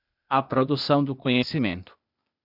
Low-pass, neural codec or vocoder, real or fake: 5.4 kHz; codec, 16 kHz, 0.8 kbps, ZipCodec; fake